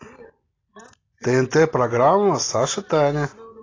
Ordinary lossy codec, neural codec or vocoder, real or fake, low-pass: AAC, 32 kbps; none; real; 7.2 kHz